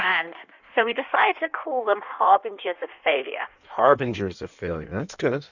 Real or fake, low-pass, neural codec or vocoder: fake; 7.2 kHz; codec, 16 kHz in and 24 kHz out, 1.1 kbps, FireRedTTS-2 codec